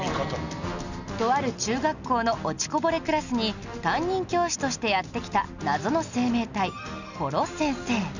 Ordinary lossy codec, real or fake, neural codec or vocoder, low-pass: none; real; none; 7.2 kHz